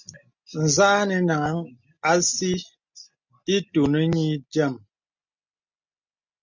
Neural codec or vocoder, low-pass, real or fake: none; 7.2 kHz; real